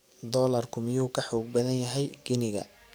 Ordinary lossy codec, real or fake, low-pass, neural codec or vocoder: none; fake; none; codec, 44.1 kHz, 7.8 kbps, DAC